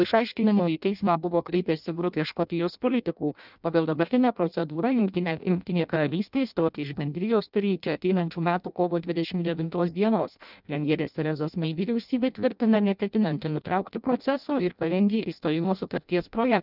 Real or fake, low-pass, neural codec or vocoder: fake; 5.4 kHz; codec, 16 kHz in and 24 kHz out, 0.6 kbps, FireRedTTS-2 codec